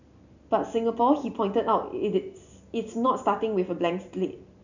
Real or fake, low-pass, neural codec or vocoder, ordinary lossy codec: real; 7.2 kHz; none; none